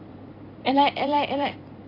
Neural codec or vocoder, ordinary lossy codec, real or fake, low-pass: vocoder, 44.1 kHz, 128 mel bands every 256 samples, BigVGAN v2; AAC, 32 kbps; fake; 5.4 kHz